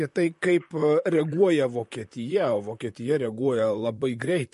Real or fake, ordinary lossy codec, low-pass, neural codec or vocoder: fake; MP3, 48 kbps; 14.4 kHz; vocoder, 44.1 kHz, 128 mel bands, Pupu-Vocoder